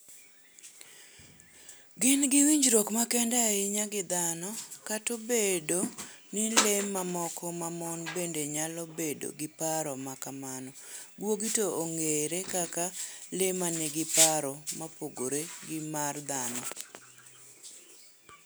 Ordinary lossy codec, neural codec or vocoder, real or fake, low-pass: none; none; real; none